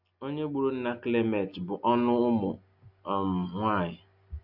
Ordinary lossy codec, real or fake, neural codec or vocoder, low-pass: none; real; none; 5.4 kHz